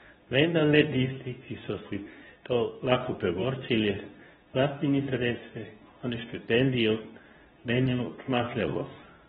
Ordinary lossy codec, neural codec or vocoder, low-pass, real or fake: AAC, 16 kbps; codec, 24 kHz, 0.9 kbps, WavTokenizer, medium speech release version 2; 10.8 kHz; fake